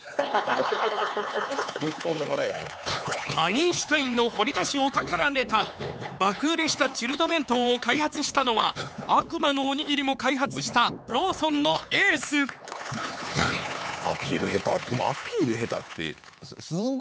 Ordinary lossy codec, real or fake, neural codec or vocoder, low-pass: none; fake; codec, 16 kHz, 4 kbps, X-Codec, HuBERT features, trained on LibriSpeech; none